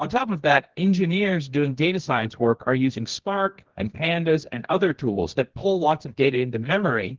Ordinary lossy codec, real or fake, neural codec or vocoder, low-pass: Opus, 16 kbps; fake; codec, 24 kHz, 0.9 kbps, WavTokenizer, medium music audio release; 7.2 kHz